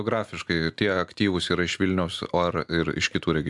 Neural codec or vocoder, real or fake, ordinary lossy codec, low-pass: none; real; MP3, 96 kbps; 10.8 kHz